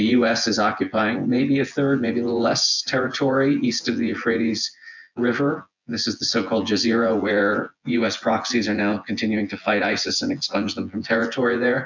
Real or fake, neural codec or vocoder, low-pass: fake; vocoder, 24 kHz, 100 mel bands, Vocos; 7.2 kHz